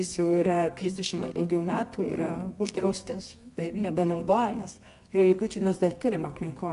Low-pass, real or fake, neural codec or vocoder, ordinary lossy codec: 10.8 kHz; fake; codec, 24 kHz, 0.9 kbps, WavTokenizer, medium music audio release; MP3, 64 kbps